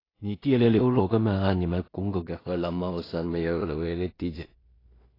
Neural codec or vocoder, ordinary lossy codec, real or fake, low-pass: codec, 16 kHz in and 24 kHz out, 0.4 kbps, LongCat-Audio-Codec, two codebook decoder; AAC, 24 kbps; fake; 5.4 kHz